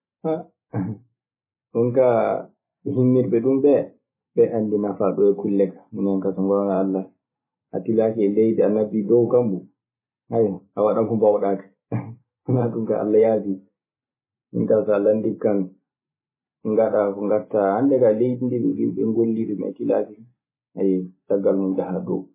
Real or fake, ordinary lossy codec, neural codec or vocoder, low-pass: real; MP3, 16 kbps; none; 3.6 kHz